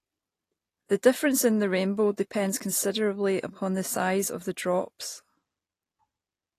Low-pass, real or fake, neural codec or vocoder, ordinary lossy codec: 14.4 kHz; real; none; AAC, 48 kbps